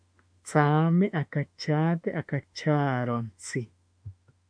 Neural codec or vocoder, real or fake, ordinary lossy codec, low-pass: autoencoder, 48 kHz, 32 numbers a frame, DAC-VAE, trained on Japanese speech; fake; MP3, 64 kbps; 9.9 kHz